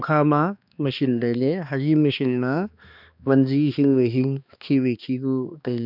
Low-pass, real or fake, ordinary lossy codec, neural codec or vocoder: 5.4 kHz; fake; none; codec, 16 kHz, 2 kbps, X-Codec, HuBERT features, trained on balanced general audio